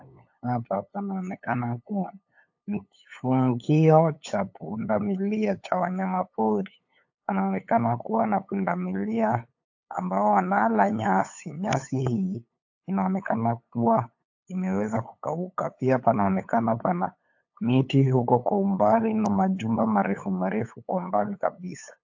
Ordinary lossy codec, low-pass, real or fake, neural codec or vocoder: AAC, 48 kbps; 7.2 kHz; fake; codec, 16 kHz, 8 kbps, FunCodec, trained on LibriTTS, 25 frames a second